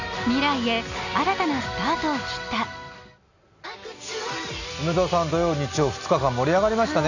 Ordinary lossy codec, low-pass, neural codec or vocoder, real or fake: none; 7.2 kHz; none; real